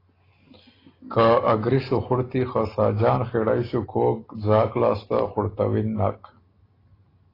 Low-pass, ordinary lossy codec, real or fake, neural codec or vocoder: 5.4 kHz; AAC, 24 kbps; real; none